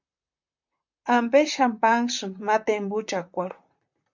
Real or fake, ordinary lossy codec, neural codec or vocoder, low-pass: fake; MP3, 64 kbps; vocoder, 44.1 kHz, 128 mel bands, Pupu-Vocoder; 7.2 kHz